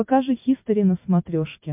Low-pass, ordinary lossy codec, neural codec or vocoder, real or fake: 3.6 kHz; MP3, 32 kbps; none; real